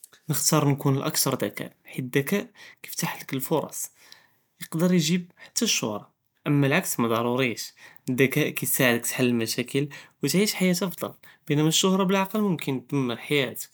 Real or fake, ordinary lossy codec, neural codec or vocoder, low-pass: real; none; none; none